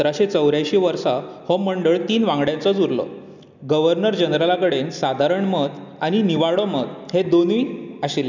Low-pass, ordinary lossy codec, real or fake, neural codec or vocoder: 7.2 kHz; none; real; none